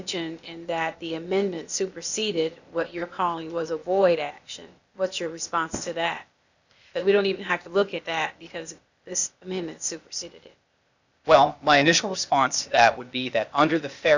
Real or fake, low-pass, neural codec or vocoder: fake; 7.2 kHz; codec, 16 kHz, 0.8 kbps, ZipCodec